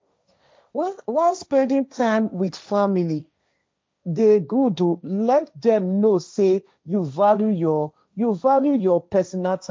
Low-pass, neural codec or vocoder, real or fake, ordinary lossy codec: none; codec, 16 kHz, 1.1 kbps, Voila-Tokenizer; fake; none